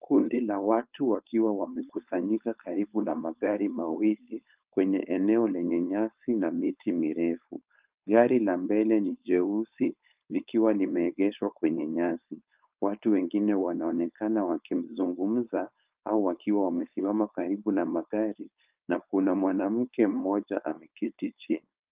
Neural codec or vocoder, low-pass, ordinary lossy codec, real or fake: codec, 16 kHz, 4.8 kbps, FACodec; 3.6 kHz; Opus, 32 kbps; fake